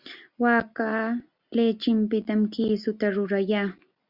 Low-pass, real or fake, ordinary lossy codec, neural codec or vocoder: 5.4 kHz; real; Opus, 64 kbps; none